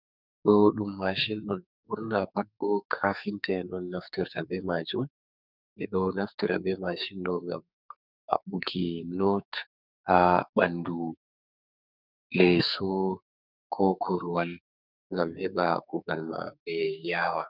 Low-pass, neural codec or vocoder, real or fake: 5.4 kHz; codec, 44.1 kHz, 2.6 kbps, SNAC; fake